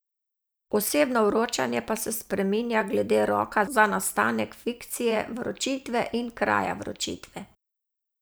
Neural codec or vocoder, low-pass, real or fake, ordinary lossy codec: vocoder, 44.1 kHz, 128 mel bands every 512 samples, BigVGAN v2; none; fake; none